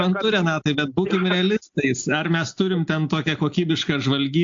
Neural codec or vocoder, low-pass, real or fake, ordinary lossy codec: none; 7.2 kHz; real; AAC, 64 kbps